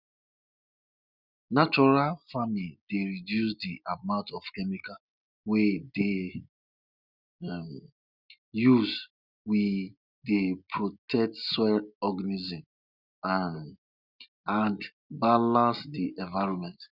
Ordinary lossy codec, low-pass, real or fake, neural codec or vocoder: none; 5.4 kHz; real; none